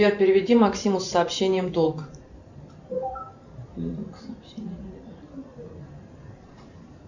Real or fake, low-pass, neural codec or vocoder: fake; 7.2 kHz; vocoder, 44.1 kHz, 128 mel bands every 512 samples, BigVGAN v2